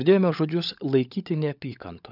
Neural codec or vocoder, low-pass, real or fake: codec, 16 kHz, 16 kbps, FunCodec, trained on LibriTTS, 50 frames a second; 5.4 kHz; fake